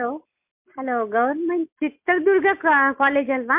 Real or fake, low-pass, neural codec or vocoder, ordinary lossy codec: real; 3.6 kHz; none; MP3, 32 kbps